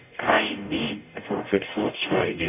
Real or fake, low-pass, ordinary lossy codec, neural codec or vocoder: fake; 3.6 kHz; none; codec, 44.1 kHz, 0.9 kbps, DAC